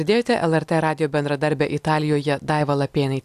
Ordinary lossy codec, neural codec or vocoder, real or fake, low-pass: AAC, 96 kbps; none; real; 14.4 kHz